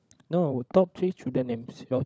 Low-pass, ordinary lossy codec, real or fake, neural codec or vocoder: none; none; fake; codec, 16 kHz, 16 kbps, FunCodec, trained on LibriTTS, 50 frames a second